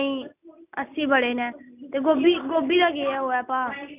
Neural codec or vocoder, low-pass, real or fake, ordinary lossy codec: none; 3.6 kHz; real; none